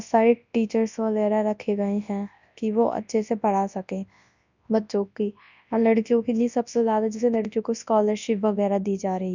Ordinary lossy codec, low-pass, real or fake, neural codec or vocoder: none; 7.2 kHz; fake; codec, 24 kHz, 0.9 kbps, WavTokenizer, large speech release